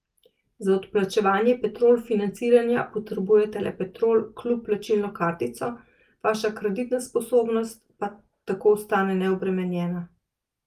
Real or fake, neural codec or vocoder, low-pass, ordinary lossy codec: real; none; 14.4 kHz; Opus, 24 kbps